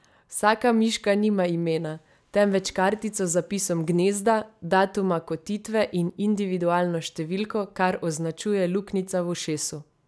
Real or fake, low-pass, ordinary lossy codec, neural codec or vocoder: real; none; none; none